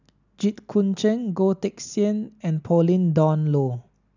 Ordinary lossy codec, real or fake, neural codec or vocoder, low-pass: none; real; none; 7.2 kHz